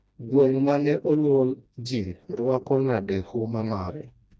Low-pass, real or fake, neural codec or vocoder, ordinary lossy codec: none; fake; codec, 16 kHz, 1 kbps, FreqCodec, smaller model; none